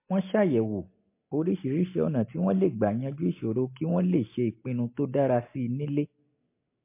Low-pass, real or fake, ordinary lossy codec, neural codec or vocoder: 3.6 kHz; real; MP3, 24 kbps; none